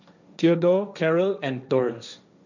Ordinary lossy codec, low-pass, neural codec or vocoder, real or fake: none; 7.2 kHz; codec, 16 kHz, 1.1 kbps, Voila-Tokenizer; fake